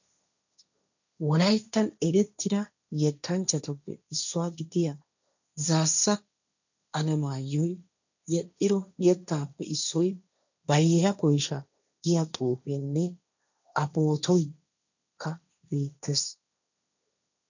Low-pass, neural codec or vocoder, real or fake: 7.2 kHz; codec, 16 kHz, 1.1 kbps, Voila-Tokenizer; fake